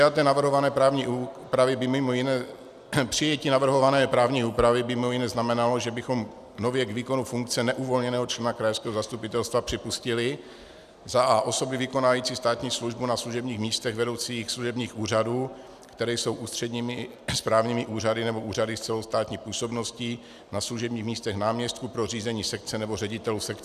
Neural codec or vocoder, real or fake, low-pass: none; real; 14.4 kHz